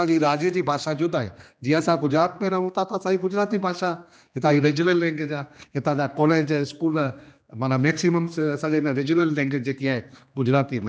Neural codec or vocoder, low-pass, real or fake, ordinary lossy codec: codec, 16 kHz, 2 kbps, X-Codec, HuBERT features, trained on general audio; none; fake; none